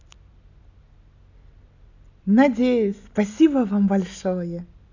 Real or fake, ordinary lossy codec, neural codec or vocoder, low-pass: real; none; none; 7.2 kHz